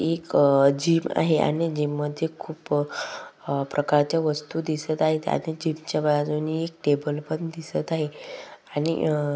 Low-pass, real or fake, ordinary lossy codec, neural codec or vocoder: none; real; none; none